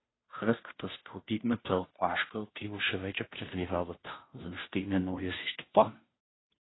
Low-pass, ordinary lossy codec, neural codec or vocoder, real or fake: 7.2 kHz; AAC, 16 kbps; codec, 16 kHz, 0.5 kbps, FunCodec, trained on Chinese and English, 25 frames a second; fake